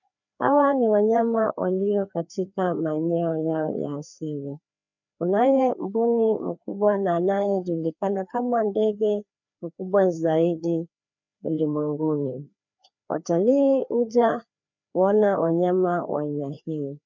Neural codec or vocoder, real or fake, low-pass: codec, 16 kHz, 2 kbps, FreqCodec, larger model; fake; 7.2 kHz